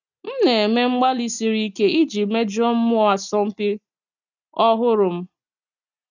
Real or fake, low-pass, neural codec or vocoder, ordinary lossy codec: real; 7.2 kHz; none; none